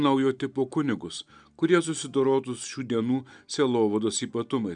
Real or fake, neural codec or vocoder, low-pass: real; none; 9.9 kHz